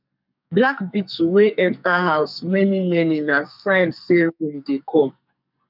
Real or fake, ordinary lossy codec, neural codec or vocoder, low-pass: fake; none; codec, 44.1 kHz, 2.6 kbps, SNAC; 5.4 kHz